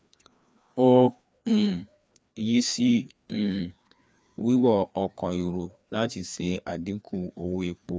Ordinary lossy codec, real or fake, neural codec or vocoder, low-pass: none; fake; codec, 16 kHz, 2 kbps, FreqCodec, larger model; none